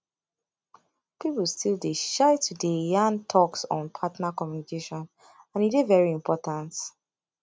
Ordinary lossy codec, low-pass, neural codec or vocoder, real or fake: none; none; none; real